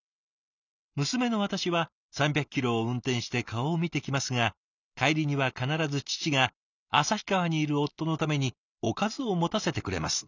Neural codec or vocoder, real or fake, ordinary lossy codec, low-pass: none; real; none; 7.2 kHz